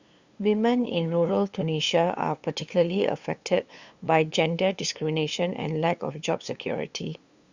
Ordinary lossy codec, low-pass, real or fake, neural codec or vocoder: Opus, 64 kbps; 7.2 kHz; fake; codec, 16 kHz, 2 kbps, FunCodec, trained on LibriTTS, 25 frames a second